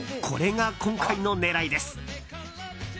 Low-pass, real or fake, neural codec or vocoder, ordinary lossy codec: none; real; none; none